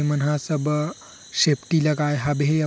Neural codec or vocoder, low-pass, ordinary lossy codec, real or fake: none; none; none; real